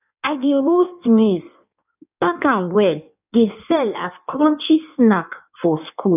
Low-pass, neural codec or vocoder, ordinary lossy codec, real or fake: 3.6 kHz; codec, 16 kHz in and 24 kHz out, 1.1 kbps, FireRedTTS-2 codec; none; fake